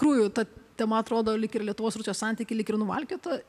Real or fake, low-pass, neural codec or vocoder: real; 14.4 kHz; none